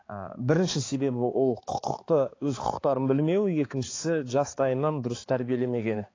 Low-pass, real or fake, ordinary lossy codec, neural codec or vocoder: 7.2 kHz; fake; AAC, 32 kbps; codec, 16 kHz, 4 kbps, X-Codec, HuBERT features, trained on balanced general audio